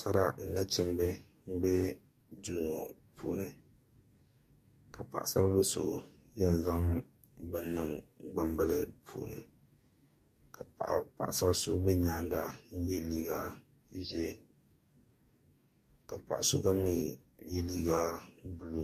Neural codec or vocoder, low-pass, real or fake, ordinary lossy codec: codec, 44.1 kHz, 2.6 kbps, DAC; 14.4 kHz; fake; MP3, 64 kbps